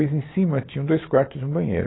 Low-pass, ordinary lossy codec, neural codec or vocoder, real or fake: 7.2 kHz; AAC, 16 kbps; none; real